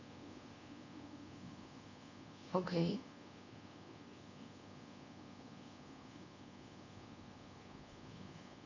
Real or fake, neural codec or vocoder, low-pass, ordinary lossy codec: fake; codec, 24 kHz, 1.2 kbps, DualCodec; 7.2 kHz; AAC, 48 kbps